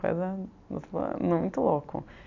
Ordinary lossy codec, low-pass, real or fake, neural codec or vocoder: AAC, 48 kbps; 7.2 kHz; real; none